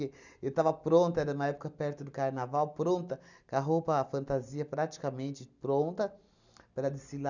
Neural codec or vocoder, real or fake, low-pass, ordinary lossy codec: none; real; 7.2 kHz; none